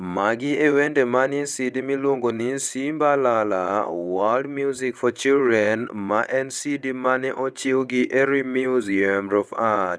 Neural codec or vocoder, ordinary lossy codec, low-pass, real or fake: vocoder, 22.05 kHz, 80 mel bands, WaveNeXt; none; none; fake